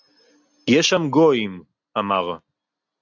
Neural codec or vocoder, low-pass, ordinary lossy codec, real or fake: none; 7.2 kHz; AAC, 48 kbps; real